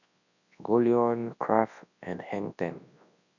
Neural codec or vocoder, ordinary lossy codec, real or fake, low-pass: codec, 24 kHz, 0.9 kbps, WavTokenizer, large speech release; none; fake; 7.2 kHz